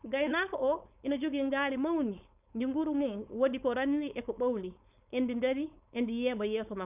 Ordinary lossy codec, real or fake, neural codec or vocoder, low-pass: none; fake; codec, 16 kHz, 4.8 kbps, FACodec; 3.6 kHz